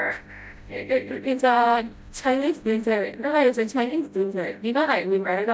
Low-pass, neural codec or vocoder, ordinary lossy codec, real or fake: none; codec, 16 kHz, 0.5 kbps, FreqCodec, smaller model; none; fake